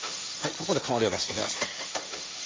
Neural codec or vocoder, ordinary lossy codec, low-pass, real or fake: codec, 16 kHz, 1.1 kbps, Voila-Tokenizer; none; none; fake